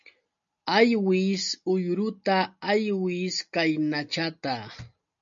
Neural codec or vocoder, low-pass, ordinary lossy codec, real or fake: none; 7.2 kHz; MP3, 48 kbps; real